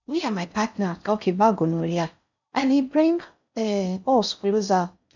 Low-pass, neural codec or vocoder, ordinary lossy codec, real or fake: 7.2 kHz; codec, 16 kHz in and 24 kHz out, 0.6 kbps, FocalCodec, streaming, 4096 codes; none; fake